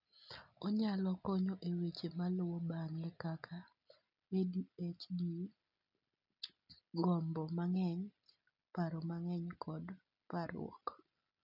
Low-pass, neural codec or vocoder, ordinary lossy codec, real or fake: 5.4 kHz; none; none; real